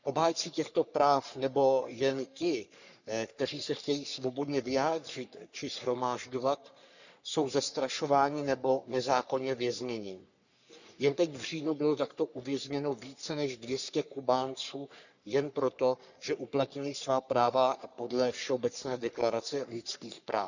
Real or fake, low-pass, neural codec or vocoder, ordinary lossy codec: fake; 7.2 kHz; codec, 44.1 kHz, 3.4 kbps, Pupu-Codec; none